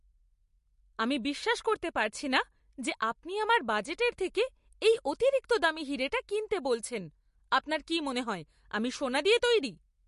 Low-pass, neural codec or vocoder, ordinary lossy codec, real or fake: 14.4 kHz; none; MP3, 48 kbps; real